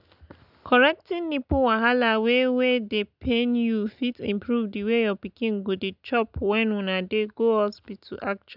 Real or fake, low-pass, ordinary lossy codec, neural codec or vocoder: real; 5.4 kHz; none; none